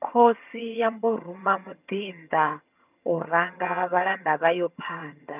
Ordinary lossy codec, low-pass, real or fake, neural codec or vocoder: none; 3.6 kHz; fake; vocoder, 22.05 kHz, 80 mel bands, HiFi-GAN